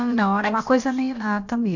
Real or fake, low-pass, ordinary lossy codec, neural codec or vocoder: fake; 7.2 kHz; none; codec, 16 kHz, about 1 kbps, DyCAST, with the encoder's durations